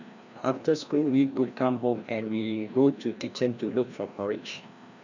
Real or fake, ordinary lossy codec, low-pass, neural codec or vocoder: fake; none; 7.2 kHz; codec, 16 kHz, 1 kbps, FreqCodec, larger model